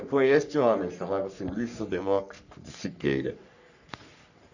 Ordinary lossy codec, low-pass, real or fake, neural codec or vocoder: none; 7.2 kHz; fake; codec, 44.1 kHz, 3.4 kbps, Pupu-Codec